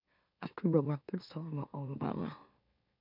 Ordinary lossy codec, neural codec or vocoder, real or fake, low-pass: none; autoencoder, 44.1 kHz, a latent of 192 numbers a frame, MeloTTS; fake; 5.4 kHz